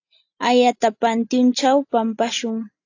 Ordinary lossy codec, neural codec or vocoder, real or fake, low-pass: AAC, 48 kbps; none; real; 7.2 kHz